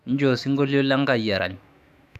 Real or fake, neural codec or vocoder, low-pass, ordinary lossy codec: fake; autoencoder, 48 kHz, 128 numbers a frame, DAC-VAE, trained on Japanese speech; 14.4 kHz; none